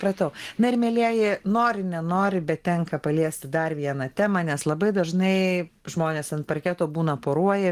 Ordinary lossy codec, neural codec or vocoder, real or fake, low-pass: Opus, 24 kbps; none; real; 14.4 kHz